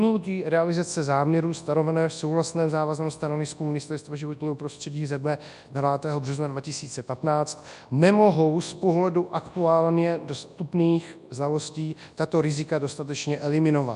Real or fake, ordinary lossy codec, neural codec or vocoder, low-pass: fake; AAC, 96 kbps; codec, 24 kHz, 0.9 kbps, WavTokenizer, large speech release; 10.8 kHz